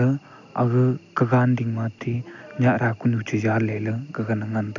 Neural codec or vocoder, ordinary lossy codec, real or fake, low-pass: none; none; real; 7.2 kHz